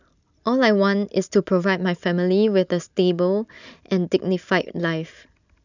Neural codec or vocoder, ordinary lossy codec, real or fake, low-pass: none; none; real; 7.2 kHz